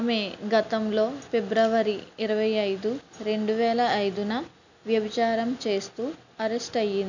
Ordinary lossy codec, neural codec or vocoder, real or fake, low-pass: none; none; real; 7.2 kHz